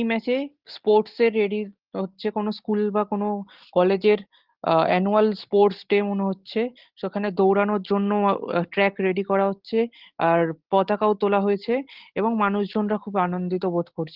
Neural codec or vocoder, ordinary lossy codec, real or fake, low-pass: none; Opus, 16 kbps; real; 5.4 kHz